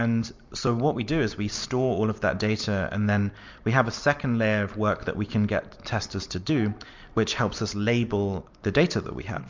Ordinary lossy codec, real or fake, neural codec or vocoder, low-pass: MP3, 64 kbps; real; none; 7.2 kHz